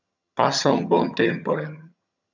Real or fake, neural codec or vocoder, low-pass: fake; vocoder, 22.05 kHz, 80 mel bands, HiFi-GAN; 7.2 kHz